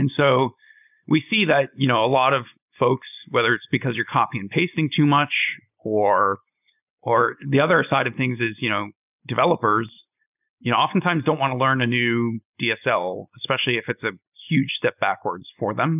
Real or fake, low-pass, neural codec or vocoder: real; 3.6 kHz; none